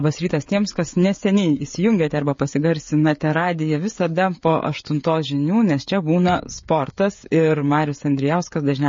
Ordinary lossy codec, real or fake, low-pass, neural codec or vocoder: MP3, 32 kbps; fake; 7.2 kHz; codec, 16 kHz, 16 kbps, FreqCodec, smaller model